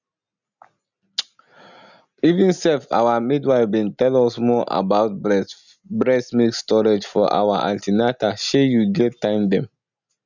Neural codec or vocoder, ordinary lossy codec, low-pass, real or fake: none; none; 7.2 kHz; real